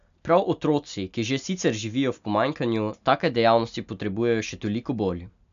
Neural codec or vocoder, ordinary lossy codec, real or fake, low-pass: none; AAC, 96 kbps; real; 7.2 kHz